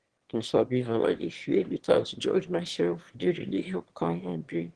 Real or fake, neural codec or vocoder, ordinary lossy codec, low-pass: fake; autoencoder, 22.05 kHz, a latent of 192 numbers a frame, VITS, trained on one speaker; Opus, 16 kbps; 9.9 kHz